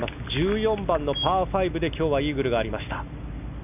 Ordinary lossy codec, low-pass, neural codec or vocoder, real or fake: none; 3.6 kHz; none; real